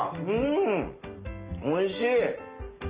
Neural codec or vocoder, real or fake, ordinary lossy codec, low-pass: none; real; Opus, 24 kbps; 3.6 kHz